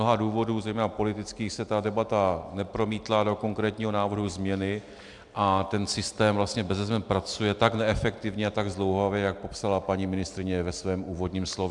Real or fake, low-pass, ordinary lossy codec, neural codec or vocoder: real; 10.8 kHz; MP3, 96 kbps; none